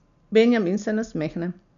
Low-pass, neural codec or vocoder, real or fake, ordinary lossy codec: 7.2 kHz; none; real; none